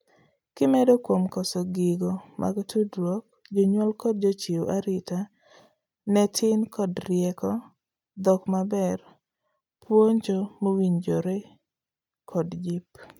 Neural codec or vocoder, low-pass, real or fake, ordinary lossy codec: none; 19.8 kHz; real; none